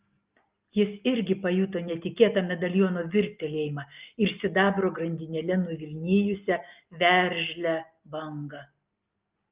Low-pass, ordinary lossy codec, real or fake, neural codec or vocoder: 3.6 kHz; Opus, 24 kbps; real; none